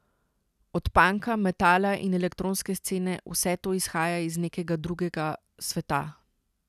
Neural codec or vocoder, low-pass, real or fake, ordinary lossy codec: none; 14.4 kHz; real; none